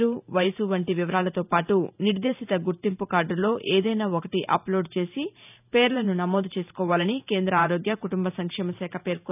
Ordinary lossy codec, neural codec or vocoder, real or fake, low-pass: none; vocoder, 44.1 kHz, 128 mel bands every 512 samples, BigVGAN v2; fake; 3.6 kHz